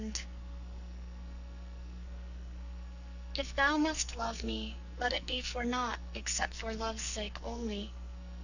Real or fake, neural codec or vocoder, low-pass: fake; codec, 44.1 kHz, 2.6 kbps, SNAC; 7.2 kHz